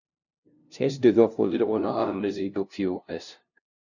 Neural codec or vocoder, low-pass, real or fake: codec, 16 kHz, 0.5 kbps, FunCodec, trained on LibriTTS, 25 frames a second; 7.2 kHz; fake